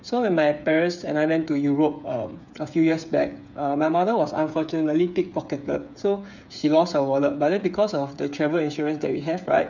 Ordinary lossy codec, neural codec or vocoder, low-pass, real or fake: none; codec, 16 kHz, 8 kbps, FreqCodec, smaller model; 7.2 kHz; fake